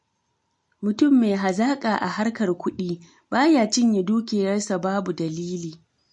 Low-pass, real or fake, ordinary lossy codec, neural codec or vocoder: 10.8 kHz; real; MP3, 48 kbps; none